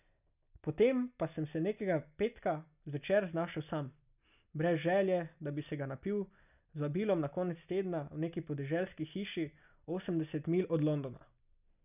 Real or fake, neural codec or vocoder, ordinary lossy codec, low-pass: real; none; none; 3.6 kHz